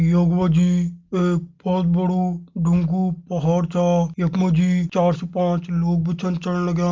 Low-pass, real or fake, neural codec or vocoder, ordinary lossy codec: 7.2 kHz; real; none; Opus, 32 kbps